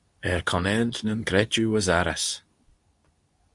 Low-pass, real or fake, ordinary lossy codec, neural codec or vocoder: 10.8 kHz; fake; Opus, 64 kbps; codec, 24 kHz, 0.9 kbps, WavTokenizer, medium speech release version 2